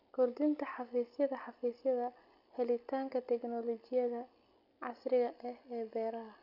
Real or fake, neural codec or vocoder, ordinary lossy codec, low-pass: real; none; none; 5.4 kHz